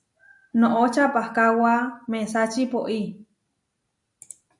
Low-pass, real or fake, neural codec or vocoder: 10.8 kHz; real; none